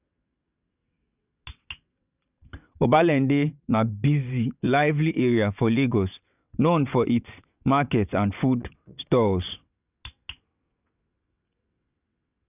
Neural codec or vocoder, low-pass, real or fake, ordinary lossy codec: vocoder, 22.05 kHz, 80 mel bands, WaveNeXt; 3.6 kHz; fake; none